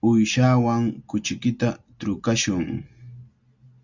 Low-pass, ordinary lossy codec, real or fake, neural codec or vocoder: 7.2 kHz; Opus, 64 kbps; real; none